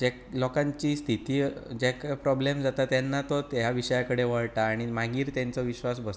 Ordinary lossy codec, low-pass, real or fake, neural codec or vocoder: none; none; real; none